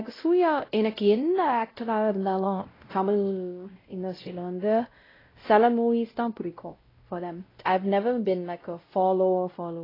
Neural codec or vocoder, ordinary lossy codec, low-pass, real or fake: codec, 16 kHz, 0.5 kbps, X-Codec, WavLM features, trained on Multilingual LibriSpeech; AAC, 24 kbps; 5.4 kHz; fake